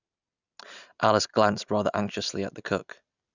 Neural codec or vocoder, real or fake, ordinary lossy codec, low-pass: none; real; none; 7.2 kHz